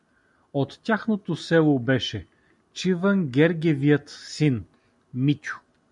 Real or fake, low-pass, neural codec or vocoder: real; 10.8 kHz; none